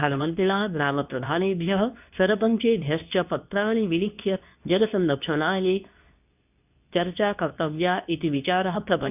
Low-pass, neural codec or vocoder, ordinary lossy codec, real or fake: 3.6 kHz; codec, 24 kHz, 0.9 kbps, WavTokenizer, medium speech release version 2; none; fake